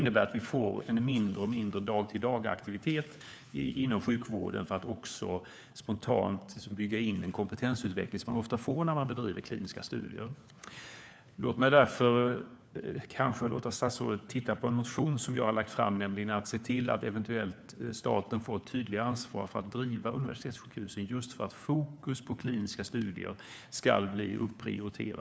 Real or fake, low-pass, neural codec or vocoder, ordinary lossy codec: fake; none; codec, 16 kHz, 4 kbps, FunCodec, trained on LibriTTS, 50 frames a second; none